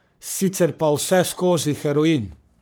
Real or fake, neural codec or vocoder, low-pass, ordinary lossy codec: fake; codec, 44.1 kHz, 3.4 kbps, Pupu-Codec; none; none